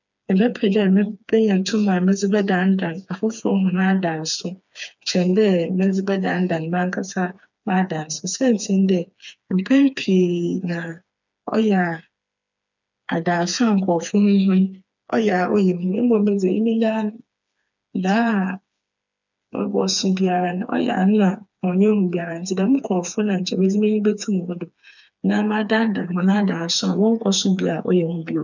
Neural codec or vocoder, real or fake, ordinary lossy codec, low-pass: codec, 16 kHz, 4 kbps, FreqCodec, smaller model; fake; none; 7.2 kHz